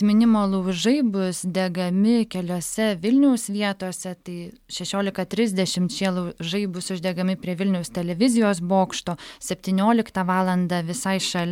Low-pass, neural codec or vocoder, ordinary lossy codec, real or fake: 19.8 kHz; none; MP3, 96 kbps; real